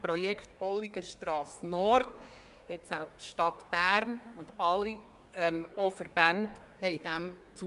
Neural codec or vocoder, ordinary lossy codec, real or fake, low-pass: codec, 24 kHz, 1 kbps, SNAC; none; fake; 10.8 kHz